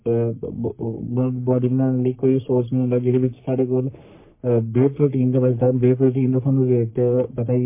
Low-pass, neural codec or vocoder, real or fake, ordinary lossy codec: 3.6 kHz; codec, 44.1 kHz, 3.4 kbps, Pupu-Codec; fake; MP3, 24 kbps